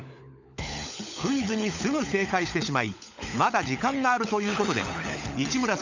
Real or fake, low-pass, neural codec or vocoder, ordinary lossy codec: fake; 7.2 kHz; codec, 16 kHz, 16 kbps, FunCodec, trained on LibriTTS, 50 frames a second; none